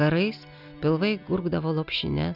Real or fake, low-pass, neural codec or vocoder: real; 5.4 kHz; none